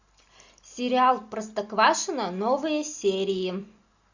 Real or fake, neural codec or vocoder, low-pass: real; none; 7.2 kHz